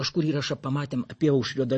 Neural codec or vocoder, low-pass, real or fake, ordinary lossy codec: vocoder, 44.1 kHz, 128 mel bands every 512 samples, BigVGAN v2; 10.8 kHz; fake; MP3, 32 kbps